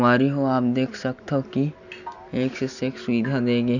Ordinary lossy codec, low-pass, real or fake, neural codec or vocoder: none; 7.2 kHz; real; none